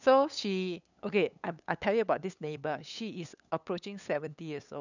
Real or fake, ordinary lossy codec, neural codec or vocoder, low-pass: fake; none; codec, 16 kHz, 8 kbps, FunCodec, trained on LibriTTS, 25 frames a second; 7.2 kHz